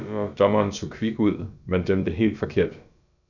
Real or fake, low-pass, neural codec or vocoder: fake; 7.2 kHz; codec, 16 kHz, about 1 kbps, DyCAST, with the encoder's durations